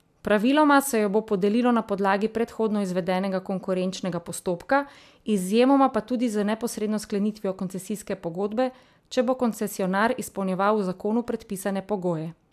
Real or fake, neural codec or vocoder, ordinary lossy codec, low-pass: real; none; AAC, 96 kbps; 14.4 kHz